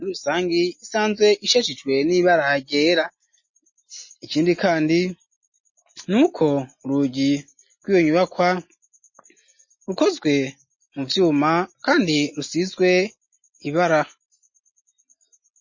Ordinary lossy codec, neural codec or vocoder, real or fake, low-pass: MP3, 32 kbps; none; real; 7.2 kHz